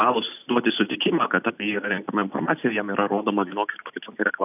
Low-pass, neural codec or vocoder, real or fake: 3.6 kHz; none; real